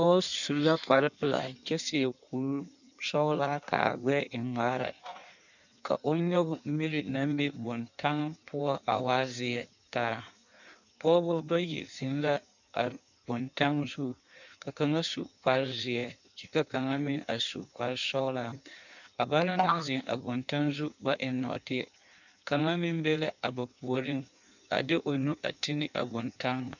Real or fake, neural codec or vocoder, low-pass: fake; codec, 16 kHz in and 24 kHz out, 1.1 kbps, FireRedTTS-2 codec; 7.2 kHz